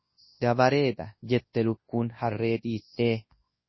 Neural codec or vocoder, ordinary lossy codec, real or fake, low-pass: codec, 24 kHz, 0.9 kbps, WavTokenizer, large speech release; MP3, 24 kbps; fake; 7.2 kHz